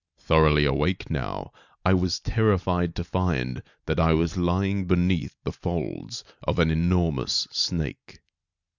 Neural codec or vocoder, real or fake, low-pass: none; real; 7.2 kHz